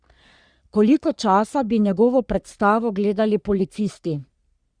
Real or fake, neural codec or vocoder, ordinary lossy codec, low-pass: fake; codec, 44.1 kHz, 3.4 kbps, Pupu-Codec; Opus, 64 kbps; 9.9 kHz